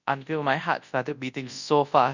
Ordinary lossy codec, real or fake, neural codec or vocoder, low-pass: none; fake; codec, 24 kHz, 0.9 kbps, WavTokenizer, large speech release; 7.2 kHz